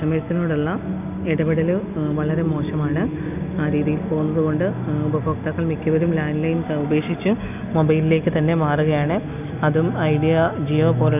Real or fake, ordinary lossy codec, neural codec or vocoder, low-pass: real; none; none; 3.6 kHz